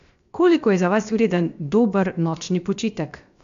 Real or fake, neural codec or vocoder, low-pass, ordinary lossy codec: fake; codec, 16 kHz, 0.7 kbps, FocalCodec; 7.2 kHz; MP3, 64 kbps